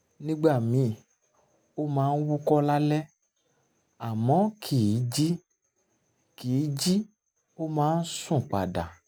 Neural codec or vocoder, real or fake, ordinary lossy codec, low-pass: none; real; none; none